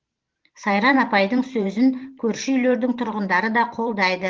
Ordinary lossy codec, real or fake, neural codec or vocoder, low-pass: Opus, 16 kbps; fake; vocoder, 44.1 kHz, 128 mel bands every 512 samples, BigVGAN v2; 7.2 kHz